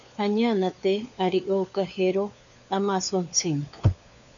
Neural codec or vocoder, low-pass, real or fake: codec, 16 kHz, 4 kbps, FunCodec, trained on LibriTTS, 50 frames a second; 7.2 kHz; fake